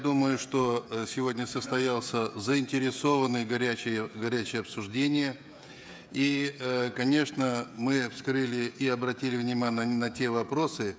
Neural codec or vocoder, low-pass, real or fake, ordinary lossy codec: codec, 16 kHz, 16 kbps, FreqCodec, smaller model; none; fake; none